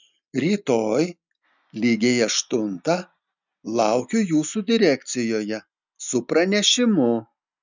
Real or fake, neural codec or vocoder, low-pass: real; none; 7.2 kHz